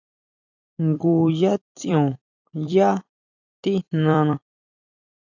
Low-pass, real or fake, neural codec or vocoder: 7.2 kHz; fake; vocoder, 44.1 kHz, 128 mel bands every 256 samples, BigVGAN v2